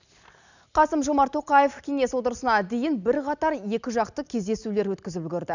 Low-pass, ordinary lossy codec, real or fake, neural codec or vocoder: 7.2 kHz; none; real; none